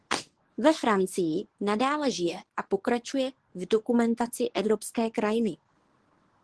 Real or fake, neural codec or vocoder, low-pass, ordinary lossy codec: fake; codec, 24 kHz, 0.9 kbps, WavTokenizer, medium speech release version 1; 10.8 kHz; Opus, 16 kbps